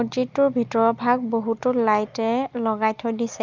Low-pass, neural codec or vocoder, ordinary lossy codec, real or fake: 7.2 kHz; none; Opus, 24 kbps; real